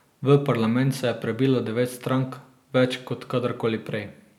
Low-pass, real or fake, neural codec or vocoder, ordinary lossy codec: 19.8 kHz; real; none; none